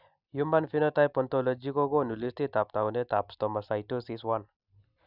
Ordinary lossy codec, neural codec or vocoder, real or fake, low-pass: none; none; real; 5.4 kHz